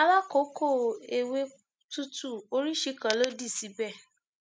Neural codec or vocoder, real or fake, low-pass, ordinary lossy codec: none; real; none; none